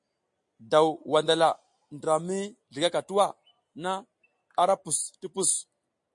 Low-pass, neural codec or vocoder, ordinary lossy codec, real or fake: 10.8 kHz; none; MP3, 48 kbps; real